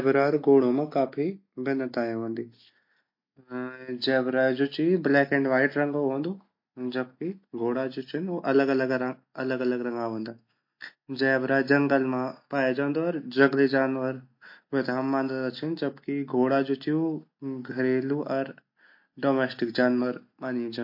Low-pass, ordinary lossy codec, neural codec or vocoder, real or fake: 5.4 kHz; MP3, 32 kbps; none; real